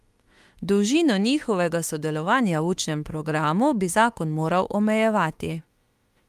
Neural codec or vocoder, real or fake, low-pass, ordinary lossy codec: autoencoder, 48 kHz, 32 numbers a frame, DAC-VAE, trained on Japanese speech; fake; 14.4 kHz; Opus, 32 kbps